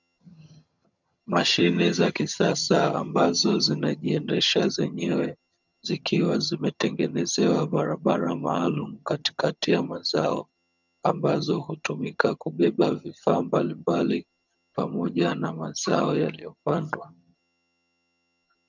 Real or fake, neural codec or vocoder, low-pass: fake; vocoder, 22.05 kHz, 80 mel bands, HiFi-GAN; 7.2 kHz